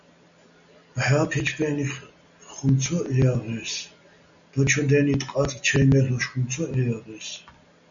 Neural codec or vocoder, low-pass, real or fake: none; 7.2 kHz; real